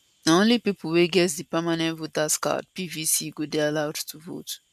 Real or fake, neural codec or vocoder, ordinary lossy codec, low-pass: real; none; none; 14.4 kHz